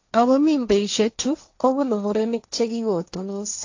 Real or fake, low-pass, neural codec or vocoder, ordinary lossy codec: fake; 7.2 kHz; codec, 16 kHz, 1.1 kbps, Voila-Tokenizer; AAC, 48 kbps